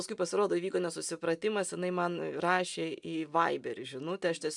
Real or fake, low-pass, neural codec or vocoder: real; 10.8 kHz; none